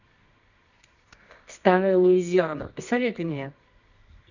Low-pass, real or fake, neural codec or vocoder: 7.2 kHz; fake; codec, 24 kHz, 0.9 kbps, WavTokenizer, medium music audio release